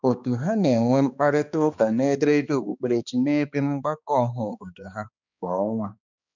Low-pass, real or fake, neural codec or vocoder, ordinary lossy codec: 7.2 kHz; fake; codec, 16 kHz, 2 kbps, X-Codec, HuBERT features, trained on balanced general audio; none